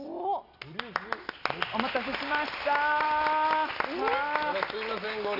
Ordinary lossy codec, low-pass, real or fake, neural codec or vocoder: none; 5.4 kHz; real; none